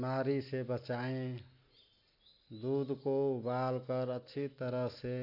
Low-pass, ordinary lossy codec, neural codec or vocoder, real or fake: 5.4 kHz; AAC, 32 kbps; none; real